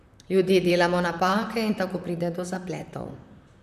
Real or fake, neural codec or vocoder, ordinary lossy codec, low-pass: fake; vocoder, 44.1 kHz, 128 mel bands, Pupu-Vocoder; none; 14.4 kHz